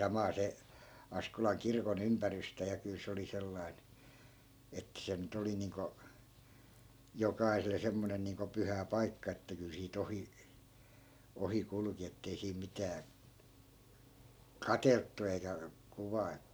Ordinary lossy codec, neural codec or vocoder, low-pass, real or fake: none; none; none; real